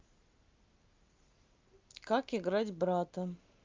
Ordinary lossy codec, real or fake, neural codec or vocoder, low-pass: Opus, 24 kbps; real; none; 7.2 kHz